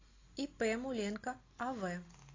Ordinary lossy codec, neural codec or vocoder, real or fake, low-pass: AAC, 32 kbps; none; real; 7.2 kHz